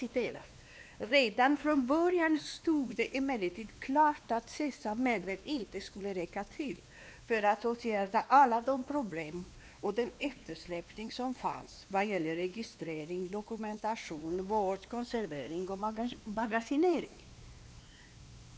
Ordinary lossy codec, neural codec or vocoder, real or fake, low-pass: none; codec, 16 kHz, 2 kbps, X-Codec, WavLM features, trained on Multilingual LibriSpeech; fake; none